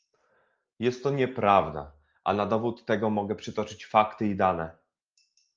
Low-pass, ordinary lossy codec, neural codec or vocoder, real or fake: 7.2 kHz; Opus, 24 kbps; none; real